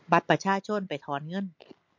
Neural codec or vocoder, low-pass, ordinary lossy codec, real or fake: none; 7.2 kHz; MP3, 48 kbps; real